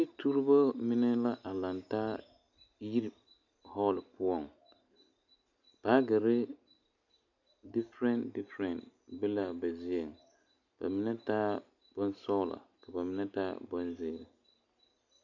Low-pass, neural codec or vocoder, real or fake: 7.2 kHz; none; real